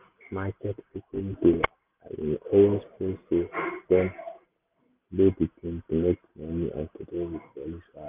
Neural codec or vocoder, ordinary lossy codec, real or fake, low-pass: none; Opus, 64 kbps; real; 3.6 kHz